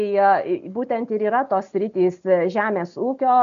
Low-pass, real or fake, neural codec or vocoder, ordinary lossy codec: 7.2 kHz; real; none; AAC, 96 kbps